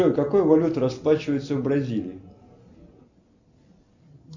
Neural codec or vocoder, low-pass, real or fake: none; 7.2 kHz; real